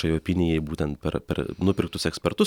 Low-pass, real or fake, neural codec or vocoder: 19.8 kHz; real; none